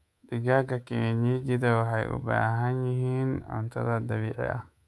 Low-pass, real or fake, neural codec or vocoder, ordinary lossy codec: none; fake; codec, 24 kHz, 3.1 kbps, DualCodec; none